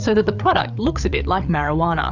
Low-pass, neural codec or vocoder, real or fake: 7.2 kHz; codec, 16 kHz, 8 kbps, FreqCodec, larger model; fake